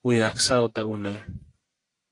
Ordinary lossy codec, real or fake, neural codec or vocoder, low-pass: AAC, 48 kbps; fake; codec, 44.1 kHz, 1.7 kbps, Pupu-Codec; 10.8 kHz